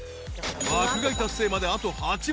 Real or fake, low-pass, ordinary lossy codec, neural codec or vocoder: real; none; none; none